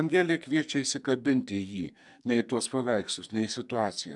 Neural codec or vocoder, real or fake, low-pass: codec, 44.1 kHz, 2.6 kbps, SNAC; fake; 10.8 kHz